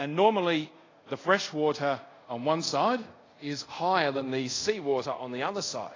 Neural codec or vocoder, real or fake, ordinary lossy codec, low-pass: codec, 24 kHz, 0.5 kbps, DualCodec; fake; AAC, 32 kbps; 7.2 kHz